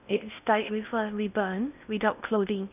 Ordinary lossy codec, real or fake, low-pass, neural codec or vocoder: none; fake; 3.6 kHz; codec, 16 kHz in and 24 kHz out, 0.6 kbps, FocalCodec, streaming, 4096 codes